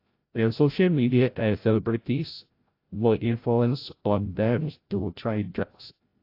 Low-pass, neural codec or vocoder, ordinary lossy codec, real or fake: 5.4 kHz; codec, 16 kHz, 0.5 kbps, FreqCodec, larger model; AAC, 32 kbps; fake